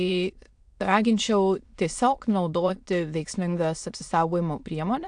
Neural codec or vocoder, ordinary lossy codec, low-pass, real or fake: autoencoder, 22.05 kHz, a latent of 192 numbers a frame, VITS, trained on many speakers; AAC, 64 kbps; 9.9 kHz; fake